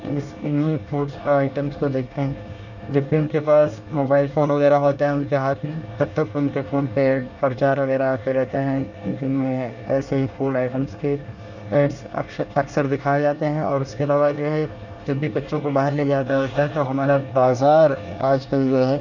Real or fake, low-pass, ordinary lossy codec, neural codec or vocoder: fake; 7.2 kHz; none; codec, 24 kHz, 1 kbps, SNAC